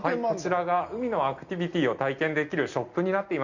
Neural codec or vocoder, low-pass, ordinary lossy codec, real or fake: none; 7.2 kHz; Opus, 64 kbps; real